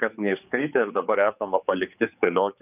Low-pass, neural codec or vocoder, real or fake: 3.6 kHz; codec, 16 kHz, 4 kbps, X-Codec, HuBERT features, trained on general audio; fake